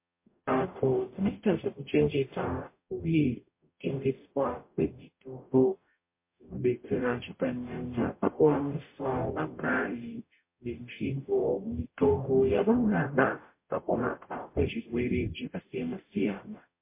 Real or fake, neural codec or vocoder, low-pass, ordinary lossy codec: fake; codec, 44.1 kHz, 0.9 kbps, DAC; 3.6 kHz; MP3, 24 kbps